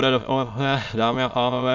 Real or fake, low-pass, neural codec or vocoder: fake; 7.2 kHz; autoencoder, 22.05 kHz, a latent of 192 numbers a frame, VITS, trained on many speakers